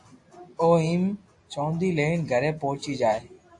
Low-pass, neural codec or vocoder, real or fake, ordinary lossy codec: 10.8 kHz; none; real; AAC, 48 kbps